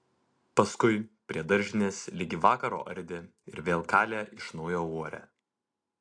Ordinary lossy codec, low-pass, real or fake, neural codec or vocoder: AAC, 48 kbps; 9.9 kHz; real; none